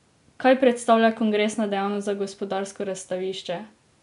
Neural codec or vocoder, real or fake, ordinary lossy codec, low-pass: none; real; none; 10.8 kHz